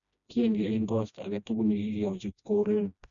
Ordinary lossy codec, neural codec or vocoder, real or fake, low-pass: MP3, 96 kbps; codec, 16 kHz, 1 kbps, FreqCodec, smaller model; fake; 7.2 kHz